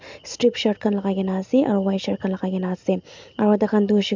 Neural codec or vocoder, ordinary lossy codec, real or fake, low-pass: none; MP3, 64 kbps; real; 7.2 kHz